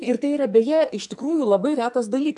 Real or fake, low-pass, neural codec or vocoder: fake; 10.8 kHz; codec, 44.1 kHz, 2.6 kbps, SNAC